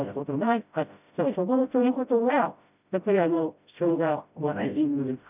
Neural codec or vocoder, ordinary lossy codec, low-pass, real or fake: codec, 16 kHz, 0.5 kbps, FreqCodec, smaller model; none; 3.6 kHz; fake